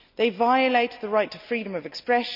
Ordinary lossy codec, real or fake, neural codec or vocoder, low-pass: none; real; none; 5.4 kHz